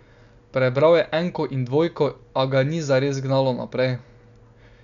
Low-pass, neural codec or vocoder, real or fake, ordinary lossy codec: 7.2 kHz; none; real; none